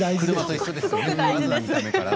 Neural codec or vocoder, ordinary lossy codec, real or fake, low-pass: none; none; real; none